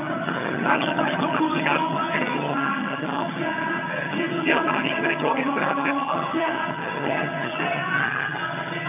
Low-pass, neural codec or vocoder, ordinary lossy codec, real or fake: 3.6 kHz; vocoder, 22.05 kHz, 80 mel bands, HiFi-GAN; none; fake